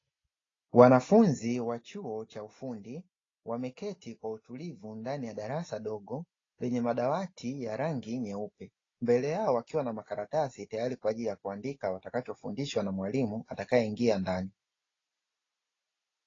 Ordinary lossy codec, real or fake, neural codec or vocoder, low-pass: AAC, 32 kbps; real; none; 7.2 kHz